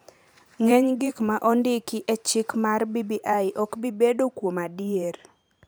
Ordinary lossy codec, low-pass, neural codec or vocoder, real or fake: none; none; vocoder, 44.1 kHz, 128 mel bands every 512 samples, BigVGAN v2; fake